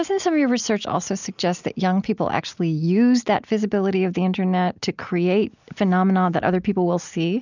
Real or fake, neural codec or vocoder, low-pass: real; none; 7.2 kHz